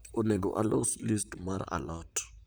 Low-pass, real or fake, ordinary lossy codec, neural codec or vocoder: none; fake; none; vocoder, 44.1 kHz, 128 mel bands, Pupu-Vocoder